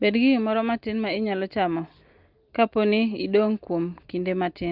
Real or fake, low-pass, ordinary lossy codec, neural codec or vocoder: real; 5.4 kHz; Opus, 24 kbps; none